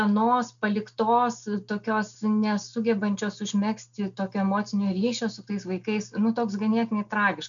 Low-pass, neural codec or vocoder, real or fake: 7.2 kHz; none; real